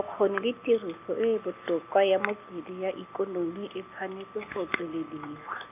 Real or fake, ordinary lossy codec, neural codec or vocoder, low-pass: real; none; none; 3.6 kHz